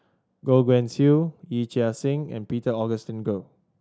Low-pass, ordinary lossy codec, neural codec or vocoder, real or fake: none; none; none; real